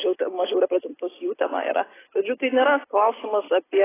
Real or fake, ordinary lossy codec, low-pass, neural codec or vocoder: real; AAC, 16 kbps; 3.6 kHz; none